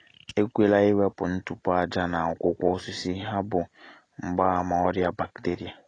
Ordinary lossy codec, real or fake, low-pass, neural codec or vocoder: AAC, 32 kbps; real; 9.9 kHz; none